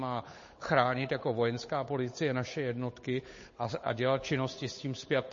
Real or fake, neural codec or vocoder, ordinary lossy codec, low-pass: fake; codec, 16 kHz, 8 kbps, FunCodec, trained on Chinese and English, 25 frames a second; MP3, 32 kbps; 7.2 kHz